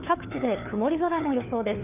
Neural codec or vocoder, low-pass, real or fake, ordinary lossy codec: codec, 16 kHz, 4 kbps, FunCodec, trained on LibriTTS, 50 frames a second; 3.6 kHz; fake; none